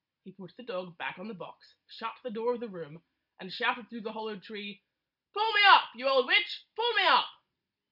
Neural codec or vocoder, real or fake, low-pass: none; real; 5.4 kHz